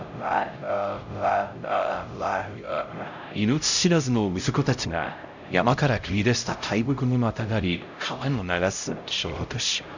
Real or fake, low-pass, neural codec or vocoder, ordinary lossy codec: fake; 7.2 kHz; codec, 16 kHz, 0.5 kbps, X-Codec, HuBERT features, trained on LibriSpeech; none